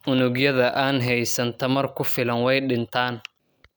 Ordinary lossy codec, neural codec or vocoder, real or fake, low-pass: none; none; real; none